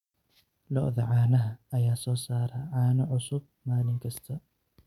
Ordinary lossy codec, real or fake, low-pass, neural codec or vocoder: none; real; 19.8 kHz; none